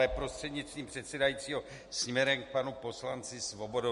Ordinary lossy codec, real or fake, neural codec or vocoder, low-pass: MP3, 48 kbps; real; none; 14.4 kHz